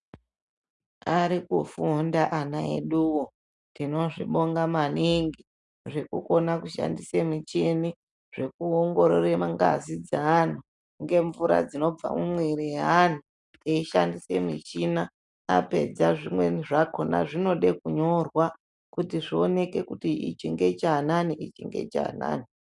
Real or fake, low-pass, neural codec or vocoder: real; 10.8 kHz; none